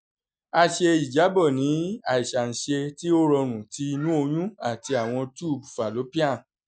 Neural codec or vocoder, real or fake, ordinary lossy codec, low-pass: none; real; none; none